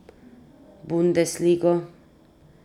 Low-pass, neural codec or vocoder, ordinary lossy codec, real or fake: 19.8 kHz; none; none; real